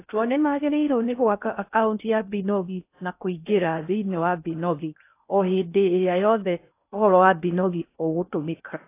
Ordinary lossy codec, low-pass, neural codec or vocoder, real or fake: AAC, 24 kbps; 3.6 kHz; codec, 16 kHz in and 24 kHz out, 0.6 kbps, FocalCodec, streaming, 2048 codes; fake